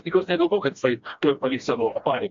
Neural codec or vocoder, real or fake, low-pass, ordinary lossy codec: codec, 16 kHz, 1 kbps, FreqCodec, smaller model; fake; 7.2 kHz; MP3, 48 kbps